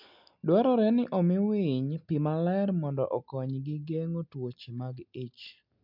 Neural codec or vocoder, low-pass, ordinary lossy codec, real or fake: none; 5.4 kHz; MP3, 48 kbps; real